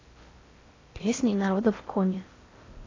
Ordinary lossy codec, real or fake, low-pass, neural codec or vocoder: AAC, 32 kbps; fake; 7.2 kHz; codec, 16 kHz in and 24 kHz out, 0.6 kbps, FocalCodec, streaming, 4096 codes